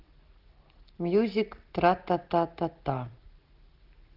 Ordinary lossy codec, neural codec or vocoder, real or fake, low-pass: Opus, 16 kbps; none; real; 5.4 kHz